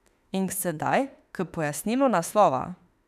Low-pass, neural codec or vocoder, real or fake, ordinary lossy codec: 14.4 kHz; autoencoder, 48 kHz, 32 numbers a frame, DAC-VAE, trained on Japanese speech; fake; none